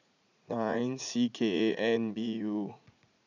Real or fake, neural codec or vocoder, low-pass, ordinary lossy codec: fake; vocoder, 44.1 kHz, 80 mel bands, Vocos; 7.2 kHz; none